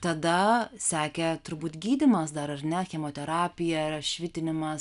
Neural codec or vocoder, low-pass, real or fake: none; 10.8 kHz; real